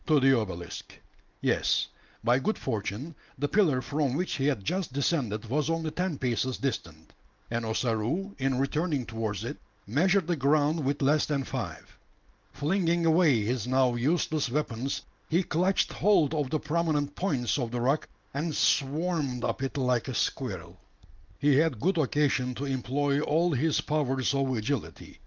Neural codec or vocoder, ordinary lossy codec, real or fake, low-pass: none; Opus, 24 kbps; real; 7.2 kHz